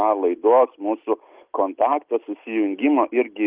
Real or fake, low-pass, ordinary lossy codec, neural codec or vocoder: real; 3.6 kHz; Opus, 32 kbps; none